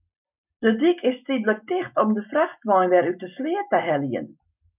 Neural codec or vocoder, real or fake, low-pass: none; real; 3.6 kHz